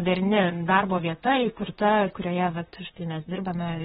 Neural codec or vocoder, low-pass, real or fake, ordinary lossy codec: vocoder, 44.1 kHz, 128 mel bands, Pupu-Vocoder; 19.8 kHz; fake; AAC, 16 kbps